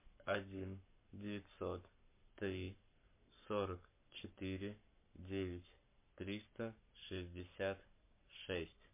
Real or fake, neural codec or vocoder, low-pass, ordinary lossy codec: fake; codec, 44.1 kHz, 7.8 kbps, Pupu-Codec; 3.6 kHz; MP3, 24 kbps